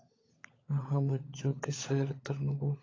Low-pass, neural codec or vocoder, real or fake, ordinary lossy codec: 7.2 kHz; codec, 16 kHz, 16 kbps, FunCodec, trained on LibriTTS, 50 frames a second; fake; AAC, 32 kbps